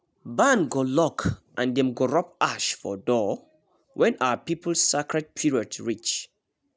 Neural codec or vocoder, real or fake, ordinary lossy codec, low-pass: none; real; none; none